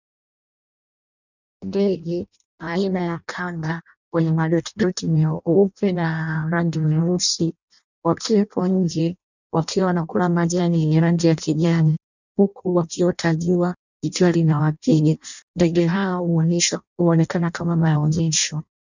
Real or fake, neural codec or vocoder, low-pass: fake; codec, 16 kHz in and 24 kHz out, 0.6 kbps, FireRedTTS-2 codec; 7.2 kHz